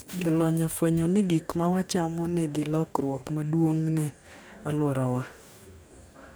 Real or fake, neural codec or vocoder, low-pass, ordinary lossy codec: fake; codec, 44.1 kHz, 2.6 kbps, DAC; none; none